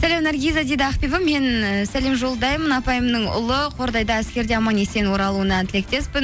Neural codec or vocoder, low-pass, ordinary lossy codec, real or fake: none; none; none; real